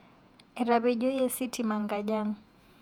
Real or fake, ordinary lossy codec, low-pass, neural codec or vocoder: fake; none; 19.8 kHz; vocoder, 48 kHz, 128 mel bands, Vocos